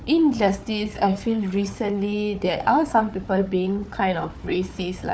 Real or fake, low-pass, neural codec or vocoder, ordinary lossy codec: fake; none; codec, 16 kHz, 4 kbps, FunCodec, trained on Chinese and English, 50 frames a second; none